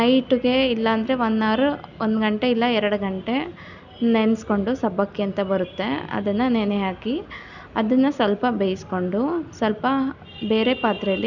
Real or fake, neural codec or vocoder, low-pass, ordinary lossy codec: real; none; 7.2 kHz; none